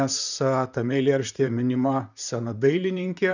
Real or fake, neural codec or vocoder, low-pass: fake; vocoder, 44.1 kHz, 128 mel bands, Pupu-Vocoder; 7.2 kHz